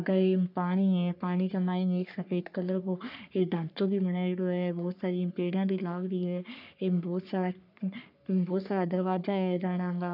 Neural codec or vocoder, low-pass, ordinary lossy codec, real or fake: codec, 44.1 kHz, 3.4 kbps, Pupu-Codec; 5.4 kHz; none; fake